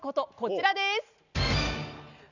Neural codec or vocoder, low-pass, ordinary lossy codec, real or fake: none; 7.2 kHz; none; real